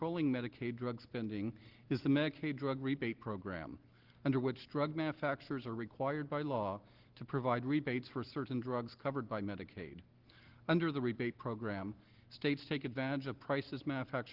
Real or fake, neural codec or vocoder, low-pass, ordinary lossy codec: real; none; 5.4 kHz; Opus, 16 kbps